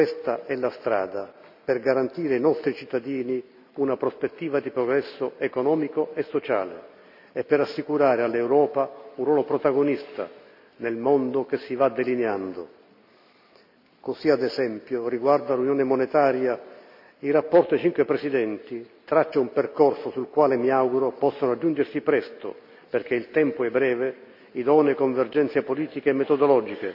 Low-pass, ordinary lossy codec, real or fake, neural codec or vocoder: 5.4 kHz; AAC, 48 kbps; real; none